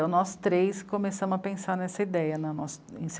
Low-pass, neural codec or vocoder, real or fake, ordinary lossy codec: none; none; real; none